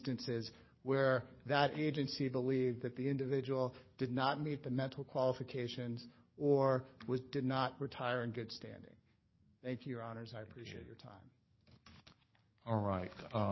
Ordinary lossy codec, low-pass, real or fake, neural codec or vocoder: MP3, 24 kbps; 7.2 kHz; fake; codec, 44.1 kHz, 7.8 kbps, DAC